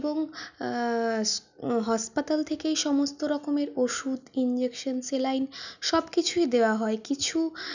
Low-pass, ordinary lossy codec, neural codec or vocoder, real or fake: 7.2 kHz; none; none; real